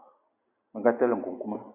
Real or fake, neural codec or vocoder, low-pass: real; none; 3.6 kHz